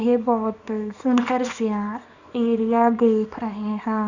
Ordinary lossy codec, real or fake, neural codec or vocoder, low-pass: none; fake; codec, 24 kHz, 0.9 kbps, WavTokenizer, small release; 7.2 kHz